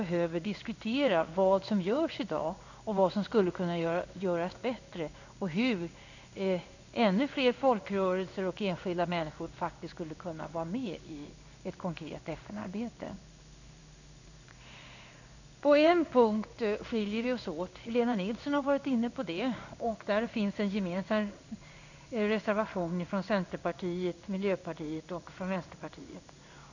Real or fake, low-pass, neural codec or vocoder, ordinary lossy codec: fake; 7.2 kHz; codec, 16 kHz in and 24 kHz out, 1 kbps, XY-Tokenizer; none